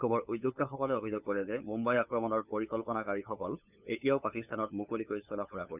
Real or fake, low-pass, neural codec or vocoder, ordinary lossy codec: fake; 3.6 kHz; codec, 16 kHz, 8 kbps, FunCodec, trained on LibriTTS, 25 frames a second; none